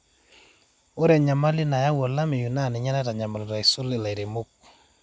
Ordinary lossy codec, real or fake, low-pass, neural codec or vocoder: none; real; none; none